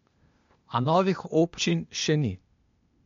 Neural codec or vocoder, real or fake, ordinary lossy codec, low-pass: codec, 16 kHz, 0.8 kbps, ZipCodec; fake; MP3, 48 kbps; 7.2 kHz